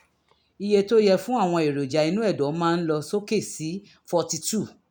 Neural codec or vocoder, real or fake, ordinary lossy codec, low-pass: none; real; none; none